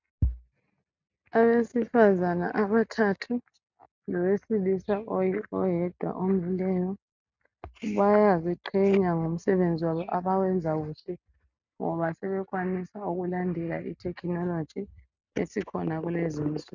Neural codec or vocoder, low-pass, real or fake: none; 7.2 kHz; real